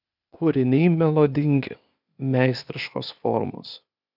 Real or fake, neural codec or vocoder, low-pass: fake; codec, 16 kHz, 0.8 kbps, ZipCodec; 5.4 kHz